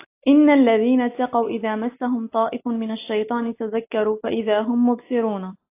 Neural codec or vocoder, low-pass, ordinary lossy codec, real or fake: none; 3.6 kHz; AAC, 24 kbps; real